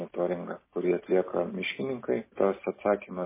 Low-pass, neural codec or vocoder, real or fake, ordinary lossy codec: 3.6 kHz; none; real; MP3, 16 kbps